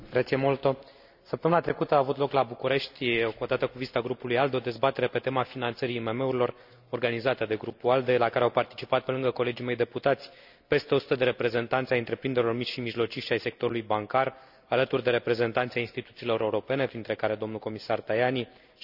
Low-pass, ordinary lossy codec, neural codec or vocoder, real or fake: 5.4 kHz; none; none; real